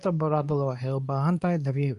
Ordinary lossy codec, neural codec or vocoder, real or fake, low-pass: none; codec, 24 kHz, 0.9 kbps, WavTokenizer, medium speech release version 2; fake; 10.8 kHz